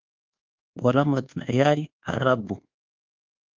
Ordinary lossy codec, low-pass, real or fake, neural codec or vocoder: Opus, 32 kbps; 7.2 kHz; fake; codec, 24 kHz, 1.2 kbps, DualCodec